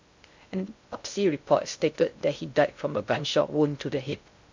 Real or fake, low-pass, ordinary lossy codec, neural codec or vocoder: fake; 7.2 kHz; MP3, 64 kbps; codec, 16 kHz in and 24 kHz out, 0.6 kbps, FocalCodec, streaming, 2048 codes